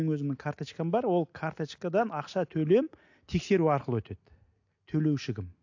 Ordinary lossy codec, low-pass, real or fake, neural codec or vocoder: AAC, 48 kbps; 7.2 kHz; real; none